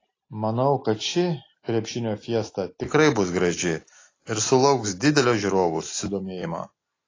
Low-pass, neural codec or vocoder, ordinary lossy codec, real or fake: 7.2 kHz; none; AAC, 32 kbps; real